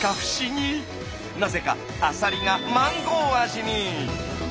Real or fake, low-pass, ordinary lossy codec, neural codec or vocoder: real; none; none; none